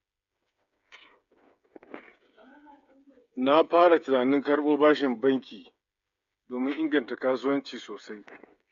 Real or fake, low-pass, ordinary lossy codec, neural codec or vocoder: fake; 7.2 kHz; AAC, 64 kbps; codec, 16 kHz, 8 kbps, FreqCodec, smaller model